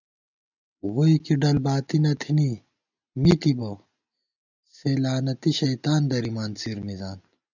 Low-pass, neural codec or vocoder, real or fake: 7.2 kHz; none; real